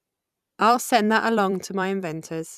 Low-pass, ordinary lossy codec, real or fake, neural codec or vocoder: 14.4 kHz; none; fake; vocoder, 44.1 kHz, 128 mel bands every 256 samples, BigVGAN v2